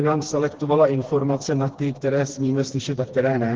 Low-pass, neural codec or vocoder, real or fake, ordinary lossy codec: 7.2 kHz; codec, 16 kHz, 2 kbps, FreqCodec, smaller model; fake; Opus, 16 kbps